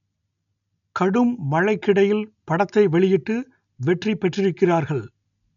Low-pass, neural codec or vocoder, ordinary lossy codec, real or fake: 7.2 kHz; none; none; real